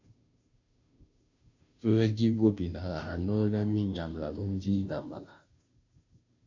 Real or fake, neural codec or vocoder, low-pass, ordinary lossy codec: fake; codec, 16 kHz, 0.5 kbps, FunCodec, trained on Chinese and English, 25 frames a second; 7.2 kHz; MP3, 64 kbps